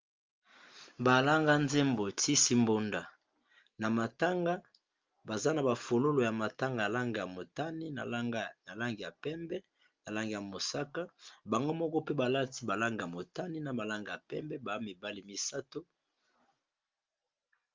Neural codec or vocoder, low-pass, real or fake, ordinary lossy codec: none; 7.2 kHz; real; Opus, 32 kbps